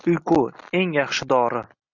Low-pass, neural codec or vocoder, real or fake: 7.2 kHz; none; real